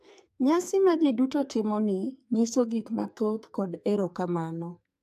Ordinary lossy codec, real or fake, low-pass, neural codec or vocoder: AAC, 96 kbps; fake; 14.4 kHz; codec, 44.1 kHz, 2.6 kbps, SNAC